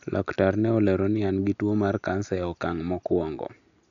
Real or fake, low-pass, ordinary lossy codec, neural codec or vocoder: real; 7.2 kHz; none; none